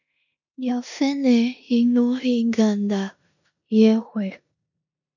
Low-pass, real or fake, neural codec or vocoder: 7.2 kHz; fake; codec, 16 kHz in and 24 kHz out, 0.9 kbps, LongCat-Audio-Codec, four codebook decoder